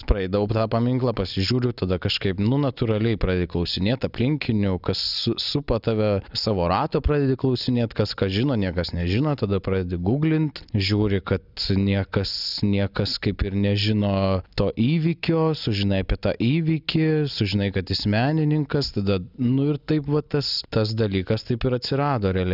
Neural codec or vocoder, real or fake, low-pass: none; real; 5.4 kHz